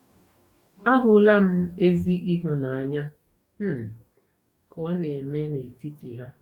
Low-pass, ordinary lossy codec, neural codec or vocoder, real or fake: 19.8 kHz; none; codec, 44.1 kHz, 2.6 kbps, DAC; fake